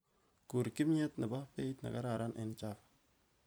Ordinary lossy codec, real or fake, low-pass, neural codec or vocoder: none; fake; none; vocoder, 44.1 kHz, 128 mel bands every 256 samples, BigVGAN v2